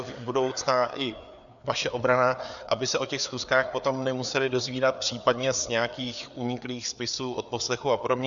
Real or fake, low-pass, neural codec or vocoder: fake; 7.2 kHz; codec, 16 kHz, 4 kbps, FreqCodec, larger model